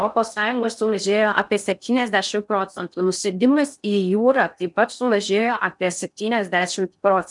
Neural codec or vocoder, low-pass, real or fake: codec, 16 kHz in and 24 kHz out, 0.6 kbps, FocalCodec, streaming, 4096 codes; 10.8 kHz; fake